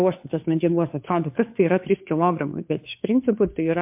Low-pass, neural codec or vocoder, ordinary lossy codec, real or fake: 3.6 kHz; codec, 16 kHz, 2 kbps, FunCodec, trained on Chinese and English, 25 frames a second; MP3, 32 kbps; fake